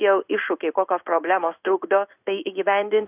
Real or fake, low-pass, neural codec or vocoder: fake; 3.6 kHz; codec, 16 kHz in and 24 kHz out, 1 kbps, XY-Tokenizer